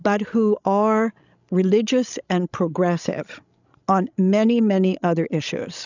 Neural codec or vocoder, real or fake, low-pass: codec, 16 kHz, 16 kbps, FreqCodec, larger model; fake; 7.2 kHz